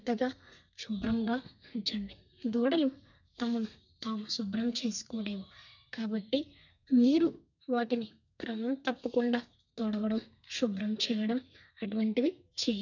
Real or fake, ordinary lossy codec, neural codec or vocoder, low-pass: fake; none; codec, 32 kHz, 1.9 kbps, SNAC; 7.2 kHz